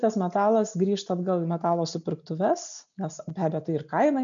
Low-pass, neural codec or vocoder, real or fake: 7.2 kHz; none; real